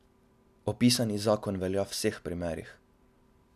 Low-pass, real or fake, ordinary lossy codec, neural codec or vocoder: 14.4 kHz; real; none; none